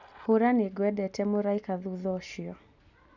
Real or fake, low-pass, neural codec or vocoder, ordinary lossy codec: real; 7.2 kHz; none; none